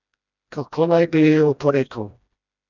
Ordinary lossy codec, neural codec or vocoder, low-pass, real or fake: none; codec, 16 kHz, 1 kbps, FreqCodec, smaller model; 7.2 kHz; fake